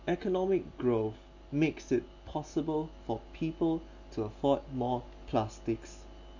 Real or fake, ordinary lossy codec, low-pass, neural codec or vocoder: real; none; 7.2 kHz; none